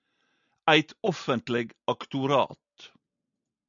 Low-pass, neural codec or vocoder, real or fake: 7.2 kHz; none; real